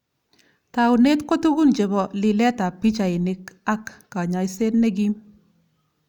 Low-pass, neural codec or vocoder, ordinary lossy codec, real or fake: 19.8 kHz; none; none; real